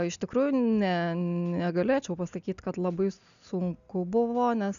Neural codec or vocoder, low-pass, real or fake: none; 7.2 kHz; real